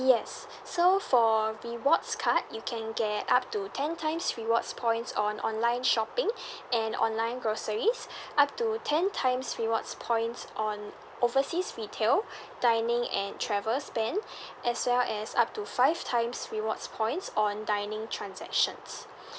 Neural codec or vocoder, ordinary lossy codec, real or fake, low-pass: none; none; real; none